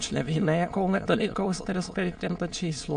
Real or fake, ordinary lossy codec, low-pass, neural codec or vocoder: fake; AAC, 64 kbps; 9.9 kHz; autoencoder, 22.05 kHz, a latent of 192 numbers a frame, VITS, trained on many speakers